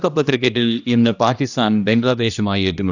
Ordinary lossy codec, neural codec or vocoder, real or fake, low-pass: none; codec, 16 kHz, 1 kbps, X-Codec, HuBERT features, trained on general audio; fake; 7.2 kHz